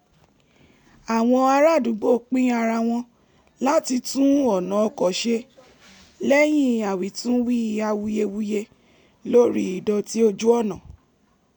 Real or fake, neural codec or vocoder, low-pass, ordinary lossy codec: real; none; 19.8 kHz; none